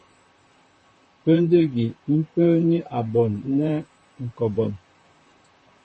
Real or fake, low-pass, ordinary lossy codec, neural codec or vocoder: fake; 10.8 kHz; MP3, 32 kbps; vocoder, 44.1 kHz, 128 mel bands, Pupu-Vocoder